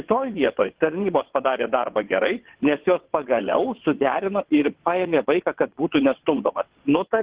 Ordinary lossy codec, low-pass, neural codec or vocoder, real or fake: Opus, 16 kbps; 3.6 kHz; vocoder, 22.05 kHz, 80 mel bands, WaveNeXt; fake